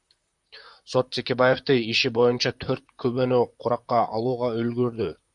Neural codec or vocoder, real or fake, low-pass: vocoder, 44.1 kHz, 128 mel bands, Pupu-Vocoder; fake; 10.8 kHz